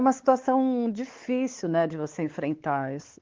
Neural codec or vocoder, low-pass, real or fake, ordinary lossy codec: codec, 16 kHz, 4 kbps, X-Codec, WavLM features, trained on Multilingual LibriSpeech; 7.2 kHz; fake; Opus, 16 kbps